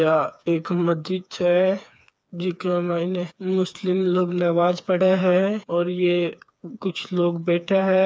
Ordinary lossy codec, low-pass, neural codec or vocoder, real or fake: none; none; codec, 16 kHz, 4 kbps, FreqCodec, smaller model; fake